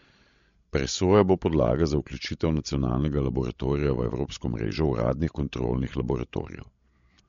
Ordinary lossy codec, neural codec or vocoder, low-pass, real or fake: MP3, 48 kbps; codec, 16 kHz, 16 kbps, FreqCodec, larger model; 7.2 kHz; fake